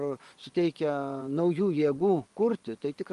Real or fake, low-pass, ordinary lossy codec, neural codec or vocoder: fake; 10.8 kHz; Opus, 24 kbps; vocoder, 24 kHz, 100 mel bands, Vocos